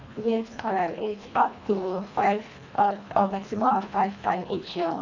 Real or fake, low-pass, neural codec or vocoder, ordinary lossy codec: fake; 7.2 kHz; codec, 24 kHz, 1.5 kbps, HILCodec; none